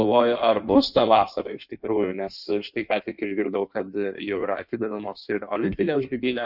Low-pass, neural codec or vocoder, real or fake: 5.4 kHz; codec, 16 kHz in and 24 kHz out, 1.1 kbps, FireRedTTS-2 codec; fake